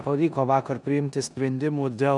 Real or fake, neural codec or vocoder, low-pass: fake; codec, 16 kHz in and 24 kHz out, 0.9 kbps, LongCat-Audio-Codec, four codebook decoder; 10.8 kHz